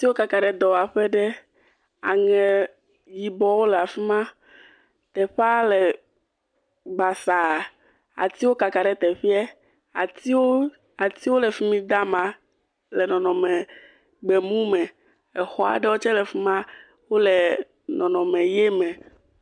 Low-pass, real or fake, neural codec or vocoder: 9.9 kHz; real; none